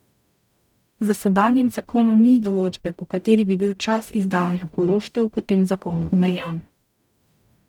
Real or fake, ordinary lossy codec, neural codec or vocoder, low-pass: fake; none; codec, 44.1 kHz, 0.9 kbps, DAC; 19.8 kHz